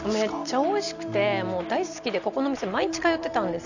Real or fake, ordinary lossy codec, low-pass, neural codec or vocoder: real; none; 7.2 kHz; none